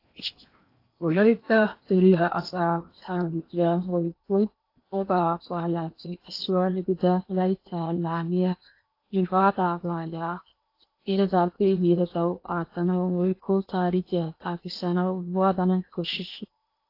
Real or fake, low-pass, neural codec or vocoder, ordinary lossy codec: fake; 5.4 kHz; codec, 16 kHz in and 24 kHz out, 0.8 kbps, FocalCodec, streaming, 65536 codes; AAC, 32 kbps